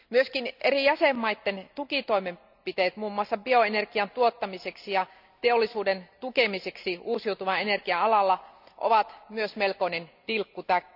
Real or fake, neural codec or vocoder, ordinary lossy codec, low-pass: real; none; none; 5.4 kHz